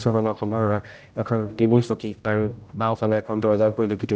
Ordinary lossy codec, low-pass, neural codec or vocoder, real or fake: none; none; codec, 16 kHz, 0.5 kbps, X-Codec, HuBERT features, trained on general audio; fake